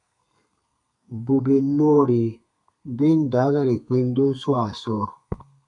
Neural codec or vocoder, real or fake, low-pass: codec, 32 kHz, 1.9 kbps, SNAC; fake; 10.8 kHz